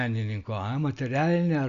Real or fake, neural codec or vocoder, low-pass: real; none; 7.2 kHz